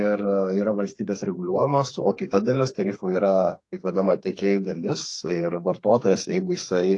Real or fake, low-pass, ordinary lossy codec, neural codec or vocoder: fake; 10.8 kHz; AAC, 48 kbps; codec, 32 kHz, 1.9 kbps, SNAC